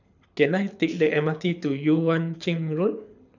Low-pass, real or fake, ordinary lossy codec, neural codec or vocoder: 7.2 kHz; fake; none; codec, 24 kHz, 6 kbps, HILCodec